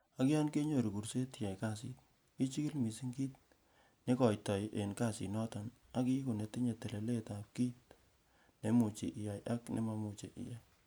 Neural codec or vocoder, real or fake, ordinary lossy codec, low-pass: none; real; none; none